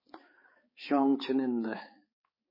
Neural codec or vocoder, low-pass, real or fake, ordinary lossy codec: codec, 16 kHz, 4 kbps, X-Codec, HuBERT features, trained on balanced general audio; 5.4 kHz; fake; MP3, 24 kbps